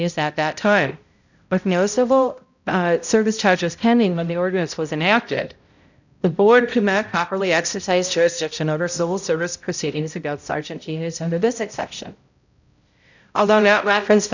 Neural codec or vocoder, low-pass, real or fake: codec, 16 kHz, 0.5 kbps, X-Codec, HuBERT features, trained on balanced general audio; 7.2 kHz; fake